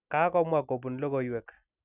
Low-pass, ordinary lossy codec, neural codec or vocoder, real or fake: 3.6 kHz; none; none; real